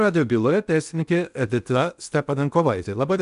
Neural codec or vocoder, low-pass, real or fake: codec, 16 kHz in and 24 kHz out, 0.8 kbps, FocalCodec, streaming, 65536 codes; 10.8 kHz; fake